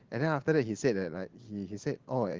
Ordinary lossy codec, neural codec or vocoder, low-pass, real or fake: Opus, 16 kbps; none; 7.2 kHz; real